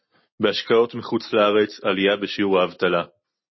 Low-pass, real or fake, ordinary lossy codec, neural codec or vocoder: 7.2 kHz; real; MP3, 24 kbps; none